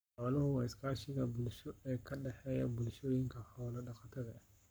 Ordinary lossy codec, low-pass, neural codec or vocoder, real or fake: none; none; codec, 44.1 kHz, 7.8 kbps, Pupu-Codec; fake